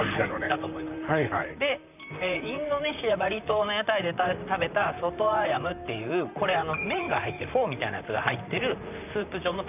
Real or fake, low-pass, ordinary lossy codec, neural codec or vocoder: fake; 3.6 kHz; none; vocoder, 44.1 kHz, 128 mel bands, Pupu-Vocoder